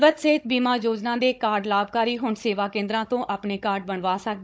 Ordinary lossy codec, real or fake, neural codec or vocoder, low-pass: none; fake; codec, 16 kHz, 16 kbps, FunCodec, trained on Chinese and English, 50 frames a second; none